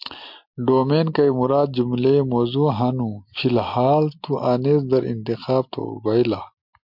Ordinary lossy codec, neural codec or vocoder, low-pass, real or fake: MP3, 48 kbps; none; 5.4 kHz; real